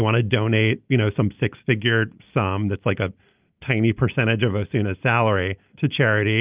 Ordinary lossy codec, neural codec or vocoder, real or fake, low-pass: Opus, 24 kbps; none; real; 3.6 kHz